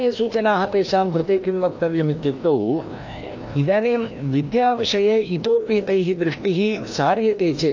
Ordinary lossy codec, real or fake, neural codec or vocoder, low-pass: AAC, 48 kbps; fake; codec, 16 kHz, 1 kbps, FreqCodec, larger model; 7.2 kHz